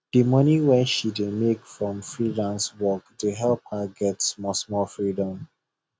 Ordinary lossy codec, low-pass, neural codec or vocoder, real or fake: none; none; none; real